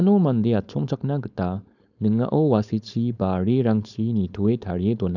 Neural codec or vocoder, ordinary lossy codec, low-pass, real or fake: codec, 16 kHz, 4.8 kbps, FACodec; none; 7.2 kHz; fake